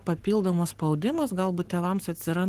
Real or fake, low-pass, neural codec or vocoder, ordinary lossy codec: fake; 14.4 kHz; codec, 44.1 kHz, 7.8 kbps, Pupu-Codec; Opus, 16 kbps